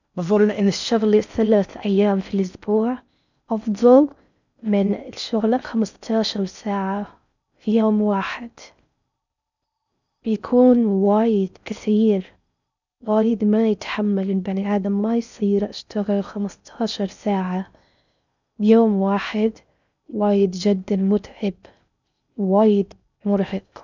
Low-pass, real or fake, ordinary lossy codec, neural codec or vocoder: 7.2 kHz; fake; none; codec, 16 kHz in and 24 kHz out, 0.6 kbps, FocalCodec, streaming, 4096 codes